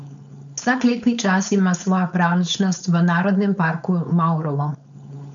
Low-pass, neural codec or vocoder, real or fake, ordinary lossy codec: 7.2 kHz; codec, 16 kHz, 4.8 kbps, FACodec; fake; none